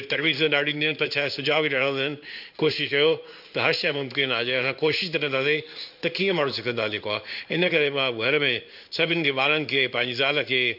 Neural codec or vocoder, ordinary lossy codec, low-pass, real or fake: codec, 16 kHz in and 24 kHz out, 1 kbps, XY-Tokenizer; none; 5.4 kHz; fake